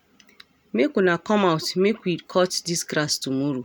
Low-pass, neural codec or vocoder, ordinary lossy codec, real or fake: none; none; none; real